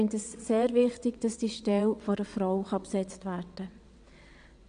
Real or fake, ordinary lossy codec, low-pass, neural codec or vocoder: fake; none; 9.9 kHz; vocoder, 22.05 kHz, 80 mel bands, WaveNeXt